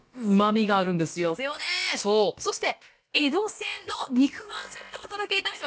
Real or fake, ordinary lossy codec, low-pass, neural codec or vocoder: fake; none; none; codec, 16 kHz, about 1 kbps, DyCAST, with the encoder's durations